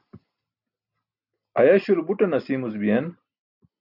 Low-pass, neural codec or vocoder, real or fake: 5.4 kHz; none; real